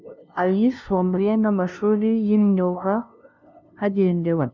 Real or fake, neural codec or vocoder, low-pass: fake; codec, 16 kHz, 0.5 kbps, FunCodec, trained on LibriTTS, 25 frames a second; 7.2 kHz